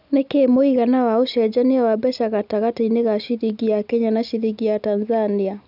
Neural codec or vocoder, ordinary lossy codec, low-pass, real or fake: none; none; 5.4 kHz; real